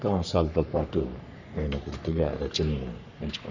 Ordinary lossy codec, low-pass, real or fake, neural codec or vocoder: none; 7.2 kHz; fake; codec, 44.1 kHz, 3.4 kbps, Pupu-Codec